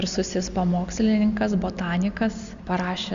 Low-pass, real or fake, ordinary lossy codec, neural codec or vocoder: 7.2 kHz; real; Opus, 64 kbps; none